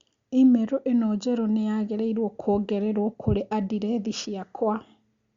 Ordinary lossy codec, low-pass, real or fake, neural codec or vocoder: Opus, 64 kbps; 7.2 kHz; real; none